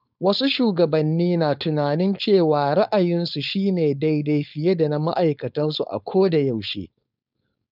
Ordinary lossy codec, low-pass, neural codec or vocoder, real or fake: none; 5.4 kHz; codec, 16 kHz, 4.8 kbps, FACodec; fake